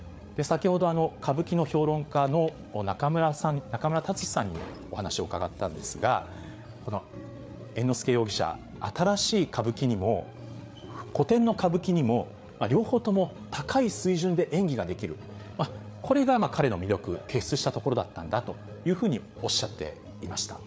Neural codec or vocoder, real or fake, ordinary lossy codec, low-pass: codec, 16 kHz, 8 kbps, FreqCodec, larger model; fake; none; none